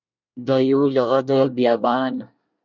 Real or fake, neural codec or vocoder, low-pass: fake; codec, 24 kHz, 1 kbps, SNAC; 7.2 kHz